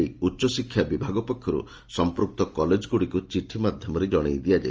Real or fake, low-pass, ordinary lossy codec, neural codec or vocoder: real; 7.2 kHz; Opus, 24 kbps; none